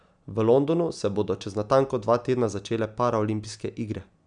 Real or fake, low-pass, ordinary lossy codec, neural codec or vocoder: real; 9.9 kHz; none; none